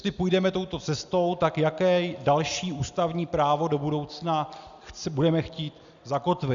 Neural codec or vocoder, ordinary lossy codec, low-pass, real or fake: none; Opus, 64 kbps; 7.2 kHz; real